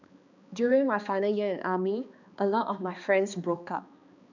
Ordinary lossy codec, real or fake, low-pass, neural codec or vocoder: none; fake; 7.2 kHz; codec, 16 kHz, 2 kbps, X-Codec, HuBERT features, trained on balanced general audio